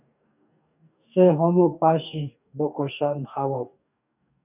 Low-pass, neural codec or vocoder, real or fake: 3.6 kHz; codec, 44.1 kHz, 2.6 kbps, DAC; fake